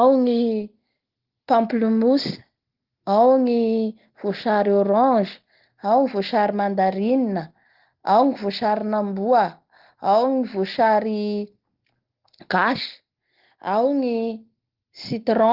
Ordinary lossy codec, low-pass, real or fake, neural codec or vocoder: Opus, 16 kbps; 5.4 kHz; real; none